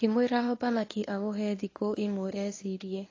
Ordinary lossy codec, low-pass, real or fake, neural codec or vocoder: AAC, 32 kbps; 7.2 kHz; fake; codec, 24 kHz, 0.9 kbps, WavTokenizer, medium speech release version 1